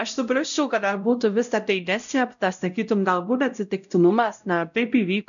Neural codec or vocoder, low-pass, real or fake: codec, 16 kHz, 0.5 kbps, X-Codec, WavLM features, trained on Multilingual LibriSpeech; 7.2 kHz; fake